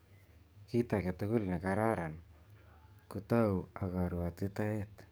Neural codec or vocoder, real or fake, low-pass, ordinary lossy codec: codec, 44.1 kHz, 7.8 kbps, DAC; fake; none; none